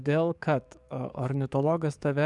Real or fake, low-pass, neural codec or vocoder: fake; 10.8 kHz; codec, 44.1 kHz, 7.8 kbps, DAC